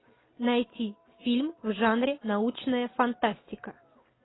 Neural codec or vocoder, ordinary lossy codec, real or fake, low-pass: none; AAC, 16 kbps; real; 7.2 kHz